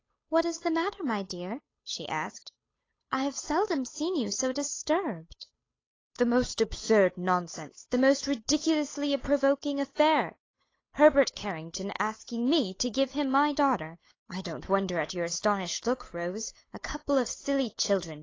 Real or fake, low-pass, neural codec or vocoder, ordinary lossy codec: fake; 7.2 kHz; codec, 16 kHz, 8 kbps, FunCodec, trained on Chinese and English, 25 frames a second; AAC, 32 kbps